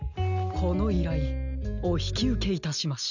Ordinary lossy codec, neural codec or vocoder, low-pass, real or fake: none; none; 7.2 kHz; real